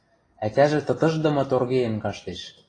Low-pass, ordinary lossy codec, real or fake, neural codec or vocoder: 9.9 kHz; AAC, 32 kbps; real; none